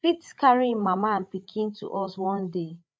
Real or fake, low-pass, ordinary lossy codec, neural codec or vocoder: fake; none; none; codec, 16 kHz, 8 kbps, FreqCodec, larger model